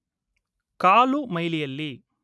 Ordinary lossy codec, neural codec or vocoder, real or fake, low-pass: none; none; real; none